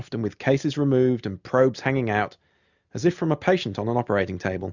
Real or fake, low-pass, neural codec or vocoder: real; 7.2 kHz; none